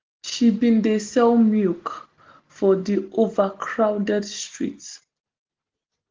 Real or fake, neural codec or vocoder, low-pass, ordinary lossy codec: real; none; 7.2 kHz; Opus, 16 kbps